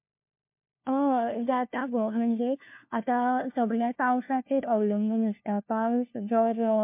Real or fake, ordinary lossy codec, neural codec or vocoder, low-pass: fake; MP3, 32 kbps; codec, 16 kHz, 1 kbps, FunCodec, trained on LibriTTS, 50 frames a second; 3.6 kHz